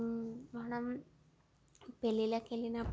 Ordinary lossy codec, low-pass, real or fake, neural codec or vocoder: none; none; real; none